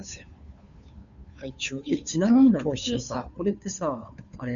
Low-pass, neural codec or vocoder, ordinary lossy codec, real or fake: 7.2 kHz; codec, 16 kHz, 2 kbps, FunCodec, trained on Chinese and English, 25 frames a second; MP3, 96 kbps; fake